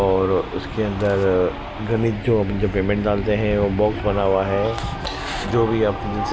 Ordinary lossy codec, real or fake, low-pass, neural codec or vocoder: none; real; none; none